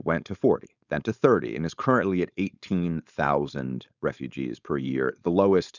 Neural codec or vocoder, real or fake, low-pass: codec, 16 kHz, 4.8 kbps, FACodec; fake; 7.2 kHz